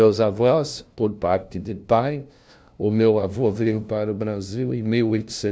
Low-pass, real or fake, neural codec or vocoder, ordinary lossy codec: none; fake; codec, 16 kHz, 0.5 kbps, FunCodec, trained on LibriTTS, 25 frames a second; none